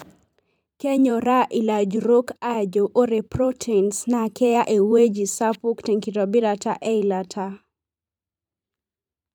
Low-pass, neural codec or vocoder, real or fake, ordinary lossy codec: 19.8 kHz; vocoder, 44.1 kHz, 128 mel bands every 512 samples, BigVGAN v2; fake; none